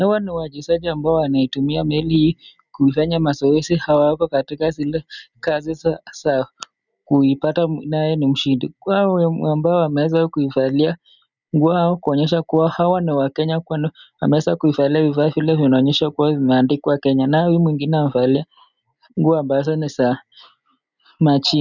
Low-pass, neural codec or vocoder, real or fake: 7.2 kHz; none; real